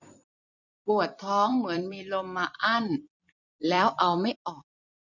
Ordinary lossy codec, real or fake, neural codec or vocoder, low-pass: none; fake; vocoder, 44.1 kHz, 128 mel bands every 256 samples, BigVGAN v2; 7.2 kHz